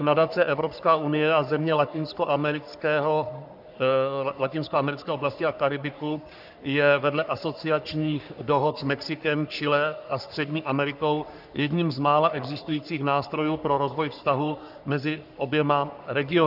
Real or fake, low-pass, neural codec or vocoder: fake; 5.4 kHz; codec, 44.1 kHz, 3.4 kbps, Pupu-Codec